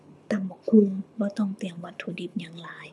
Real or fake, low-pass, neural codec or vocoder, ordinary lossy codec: fake; none; codec, 24 kHz, 6 kbps, HILCodec; none